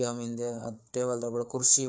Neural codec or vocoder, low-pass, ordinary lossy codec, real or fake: codec, 16 kHz, 4 kbps, FreqCodec, larger model; none; none; fake